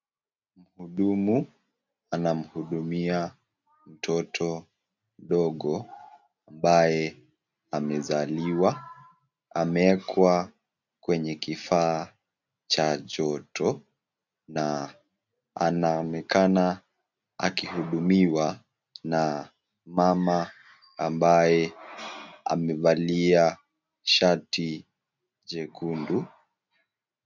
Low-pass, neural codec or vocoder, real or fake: 7.2 kHz; none; real